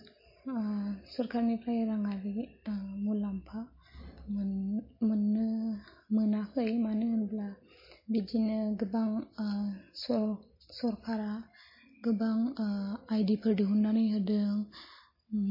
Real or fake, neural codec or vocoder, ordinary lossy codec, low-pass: real; none; MP3, 24 kbps; 5.4 kHz